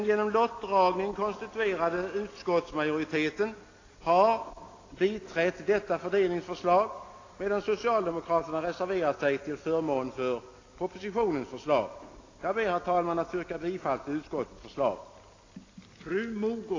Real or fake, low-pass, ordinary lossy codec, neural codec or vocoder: real; 7.2 kHz; AAC, 32 kbps; none